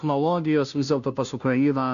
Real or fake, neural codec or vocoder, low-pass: fake; codec, 16 kHz, 0.5 kbps, FunCodec, trained on Chinese and English, 25 frames a second; 7.2 kHz